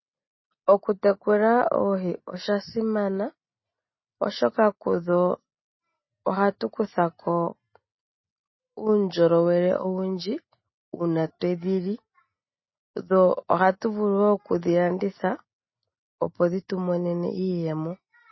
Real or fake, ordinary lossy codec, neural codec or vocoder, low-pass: real; MP3, 24 kbps; none; 7.2 kHz